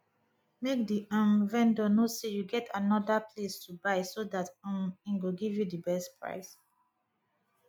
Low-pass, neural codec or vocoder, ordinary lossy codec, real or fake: 19.8 kHz; none; none; real